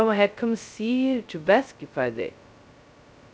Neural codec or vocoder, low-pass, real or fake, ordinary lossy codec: codec, 16 kHz, 0.2 kbps, FocalCodec; none; fake; none